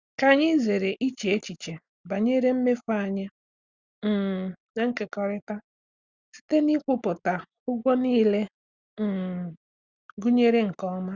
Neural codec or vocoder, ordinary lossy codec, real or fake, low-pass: none; Opus, 64 kbps; real; 7.2 kHz